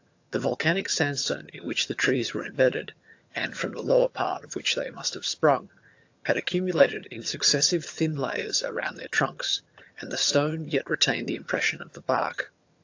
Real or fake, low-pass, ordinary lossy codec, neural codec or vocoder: fake; 7.2 kHz; AAC, 48 kbps; vocoder, 22.05 kHz, 80 mel bands, HiFi-GAN